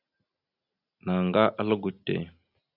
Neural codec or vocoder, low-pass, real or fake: none; 5.4 kHz; real